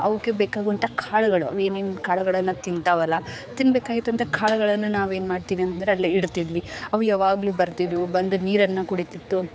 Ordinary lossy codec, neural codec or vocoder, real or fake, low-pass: none; codec, 16 kHz, 4 kbps, X-Codec, HuBERT features, trained on general audio; fake; none